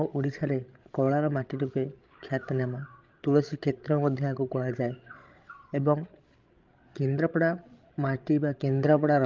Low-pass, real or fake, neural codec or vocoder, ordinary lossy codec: 7.2 kHz; fake; codec, 16 kHz, 16 kbps, FreqCodec, larger model; Opus, 24 kbps